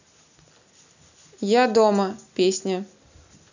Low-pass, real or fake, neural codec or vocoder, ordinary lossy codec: 7.2 kHz; real; none; none